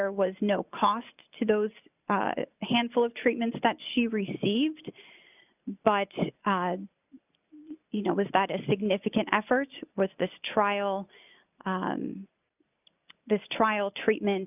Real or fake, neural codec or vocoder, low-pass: real; none; 3.6 kHz